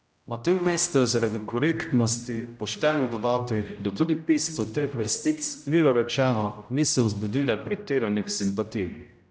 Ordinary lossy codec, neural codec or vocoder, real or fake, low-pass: none; codec, 16 kHz, 0.5 kbps, X-Codec, HuBERT features, trained on general audio; fake; none